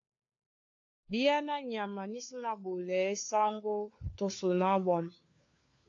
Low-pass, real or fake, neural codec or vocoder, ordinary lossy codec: 7.2 kHz; fake; codec, 16 kHz, 4 kbps, FunCodec, trained on LibriTTS, 50 frames a second; AAC, 48 kbps